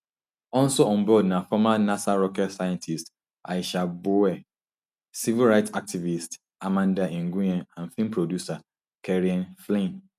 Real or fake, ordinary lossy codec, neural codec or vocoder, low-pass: real; none; none; 14.4 kHz